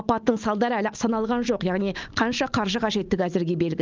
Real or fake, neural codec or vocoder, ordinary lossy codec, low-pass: real; none; Opus, 32 kbps; 7.2 kHz